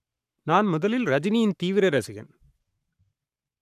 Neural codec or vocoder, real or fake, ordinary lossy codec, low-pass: codec, 44.1 kHz, 7.8 kbps, Pupu-Codec; fake; none; 14.4 kHz